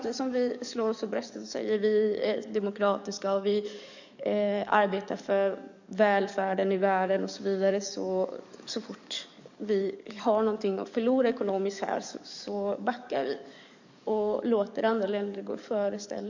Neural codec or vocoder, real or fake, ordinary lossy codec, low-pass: codec, 44.1 kHz, 7.8 kbps, DAC; fake; none; 7.2 kHz